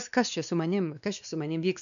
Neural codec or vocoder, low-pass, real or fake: codec, 16 kHz, 2 kbps, X-Codec, WavLM features, trained on Multilingual LibriSpeech; 7.2 kHz; fake